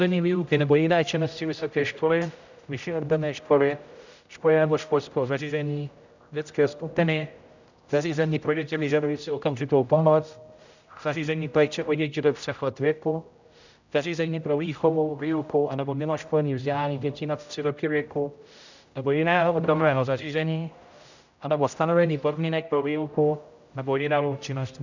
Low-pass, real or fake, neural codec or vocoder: 7.2 kHz; fake; codec, 16 kHz, 0.5 kbps, X-Codec, HuBERT features, trained on general audio